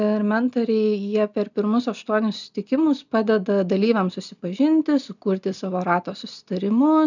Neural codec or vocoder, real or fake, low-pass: none; real; 7.2 kHz